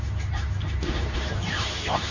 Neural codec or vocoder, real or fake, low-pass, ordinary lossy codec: codec, 24 kHz, 0.9 kbps, WavTokenizer, medium speech release version 2; fake; 7.2 kHz; none